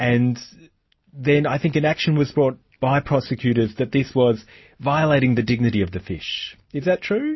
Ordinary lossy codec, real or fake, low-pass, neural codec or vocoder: MP3, 24 kbps; real; 7.2 kHz; none